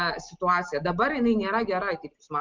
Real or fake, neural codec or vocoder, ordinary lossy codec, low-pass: real; none; Opus, 24 kbps; 7.2 kHz